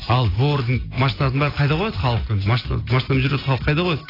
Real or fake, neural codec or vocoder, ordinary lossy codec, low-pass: real; none; AAC, 24 kbps; 5.4 kHz